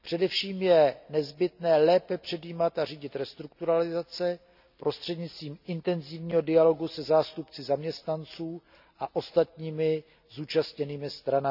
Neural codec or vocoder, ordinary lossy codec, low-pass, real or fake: none; none; 5.4 kHz; real